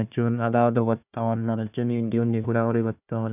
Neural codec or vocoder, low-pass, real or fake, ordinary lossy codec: codec, 16 kHz, 1 kbps, FunCodec, trained on Chinese and English, 50 frames a second; 3.6 kHz; fake; none